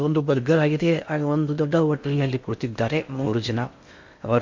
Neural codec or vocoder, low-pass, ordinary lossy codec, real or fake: codec, 16 kHz in and 24 kHz out, 0.6 kbps, FocalCodec, streaming, 2048 codes; 7.2 kHz; MP3, 48 kbps; fake